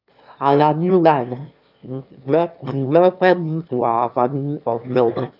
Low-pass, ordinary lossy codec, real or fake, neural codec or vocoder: 5.4 kHz; none; fake; autoencoder, 22.05 kHz, a latent of 192 numbers a frame, VITS, trained on one speaker